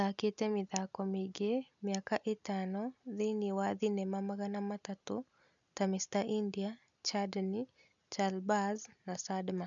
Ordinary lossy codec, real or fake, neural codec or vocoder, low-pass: none; real; none; 7.2 kHz